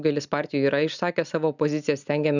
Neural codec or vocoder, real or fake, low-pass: none; real; 7.2 kHz